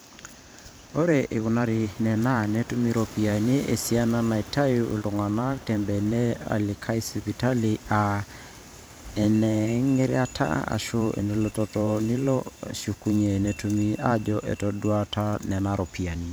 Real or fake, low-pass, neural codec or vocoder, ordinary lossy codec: fake; none; vocoder, 44.1 kHz, 128 mel bands every 512 samples, BigVGAN v2; none